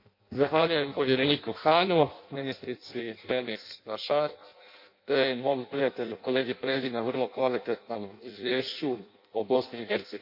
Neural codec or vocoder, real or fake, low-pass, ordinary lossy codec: codec, 16 kHz in and 24 kHz out, 0.6 kbps, FireRedTTS-2 codec; fake; 5.4 kHz; MP3, 32 kbps